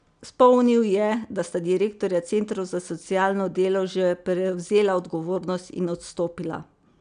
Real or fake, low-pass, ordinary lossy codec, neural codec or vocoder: real; 9.9 kHz; none; none